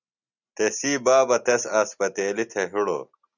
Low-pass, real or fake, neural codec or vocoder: 7.2 kHz; real; none